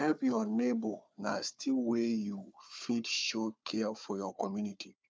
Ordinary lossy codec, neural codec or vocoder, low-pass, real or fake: none; codec, 16 kHz, 4 kbps, FunCodec, trained on Chinese and English, 50 frames a second; none; fake